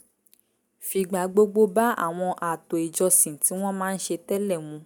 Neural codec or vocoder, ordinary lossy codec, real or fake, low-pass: none; none; real; none